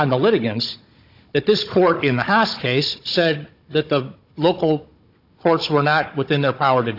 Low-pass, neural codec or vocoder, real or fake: 5.4 kHz; codec, 16 kHz, 16 kbps, FunCodec, trained on Chinese and English, 50 frames a second; fake